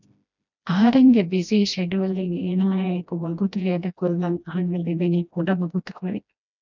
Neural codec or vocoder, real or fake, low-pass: codec, 16 kHz, 1 kbps, FreqCodec, smaller model; fake; 7.2 kHz